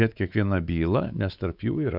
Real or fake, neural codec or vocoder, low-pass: fake; codec, 16 kHz, 4 kbps, X-Codec, WavLM features, trained on Multilingual LibriSpeech; 5.4 kHz